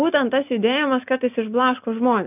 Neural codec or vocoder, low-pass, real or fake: none; 3.6 kHz; real